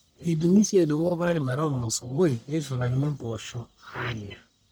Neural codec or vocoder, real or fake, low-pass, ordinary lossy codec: codec, 44.1 kHz, 1.7 kbps, Pupu-Codec; fake; none; none